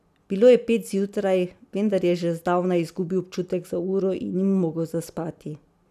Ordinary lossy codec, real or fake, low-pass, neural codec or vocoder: none; real; 14.4 kHz; none